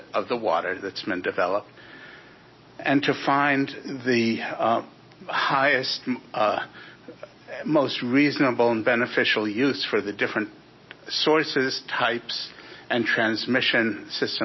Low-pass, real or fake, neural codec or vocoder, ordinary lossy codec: 7.2 kHz; real; none; MP3, 24 kbps